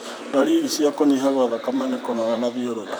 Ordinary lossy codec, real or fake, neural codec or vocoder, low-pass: none; fake; vocoder, 44.1 kHz, 128 mel bands, Pupu-Vocoder; none